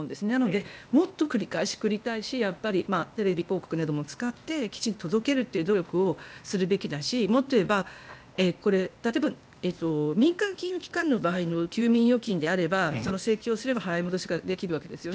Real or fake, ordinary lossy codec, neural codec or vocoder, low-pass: fake; none; codec, 16 kHz, 0.8 kbps, ZipCodec; none